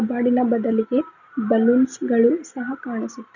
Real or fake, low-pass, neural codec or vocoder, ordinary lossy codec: real; 7.2 kHz; none; none